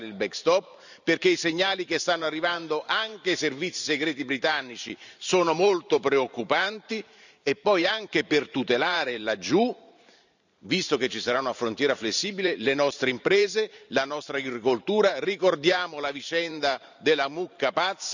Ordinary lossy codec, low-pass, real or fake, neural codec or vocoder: none; 7.2 kHz; fake; vocoder, 44.1 kHz, 128 mel bands every 512 samples, BigVGAN v2